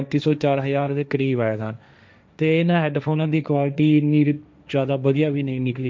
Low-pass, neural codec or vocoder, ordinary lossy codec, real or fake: none; codec, 16 kHz, 1.1 kbps, Voila-Tokenizer; none; fake